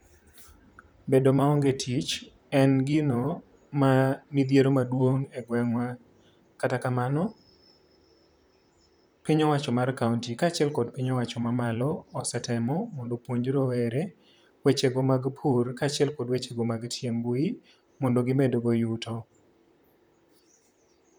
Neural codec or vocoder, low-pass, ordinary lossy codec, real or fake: vocoder, 44.1 kHz, 128 mel bands, Pupu-Vocoder; none; none; fake